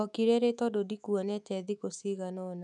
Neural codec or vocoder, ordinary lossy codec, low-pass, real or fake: autoencoder, 48 kHz, 128 numbers a frame, DAC-VAE, trained on Japanese speech; none; 10.8 kHz; fake